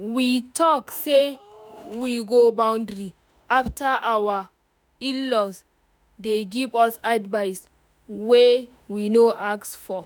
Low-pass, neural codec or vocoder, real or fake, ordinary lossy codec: none; autoencoder, 48 kHz, 32 numbers a frame, DAC-VAE, trained on Japanese speech; fake; none